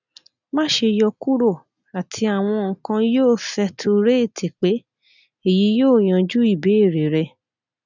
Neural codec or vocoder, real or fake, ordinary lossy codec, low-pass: none; real; none; 7.2 kHz